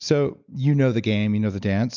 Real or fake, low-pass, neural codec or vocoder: real; 7.2 kHz; none